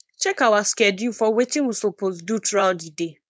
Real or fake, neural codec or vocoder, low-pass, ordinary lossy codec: fake; codec, 16 kHz, 4.8 kbps, FACodec; none; none